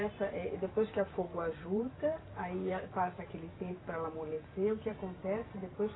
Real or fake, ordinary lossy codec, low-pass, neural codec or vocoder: fake; AAC, 16 kbps; 7.2 kHz; vocoder, 44.1 kHz, 128 mel bands every 512 samples, BigVGAN v2